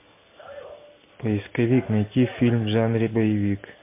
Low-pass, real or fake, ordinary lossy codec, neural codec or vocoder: 3.6 kHz; real; MP3, 24 kbps; none